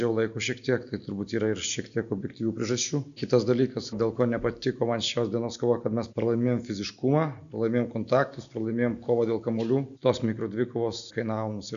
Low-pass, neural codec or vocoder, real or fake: 7.2 kHz; none; real